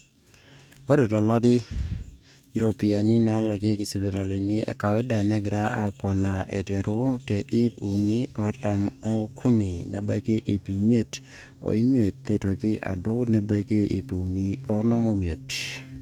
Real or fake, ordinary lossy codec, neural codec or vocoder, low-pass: fake; none; codec, 44.1 kHz, 2.6 kbps, DAC; 19.8 kHz